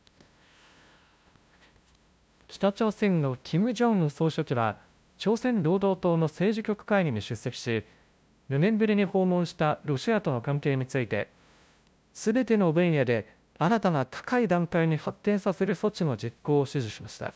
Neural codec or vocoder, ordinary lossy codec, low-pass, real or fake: codec, 16 kHz, 0.5 kbps, FunCodec, trained on LibriTTS, 25 frames a second; none; none; fake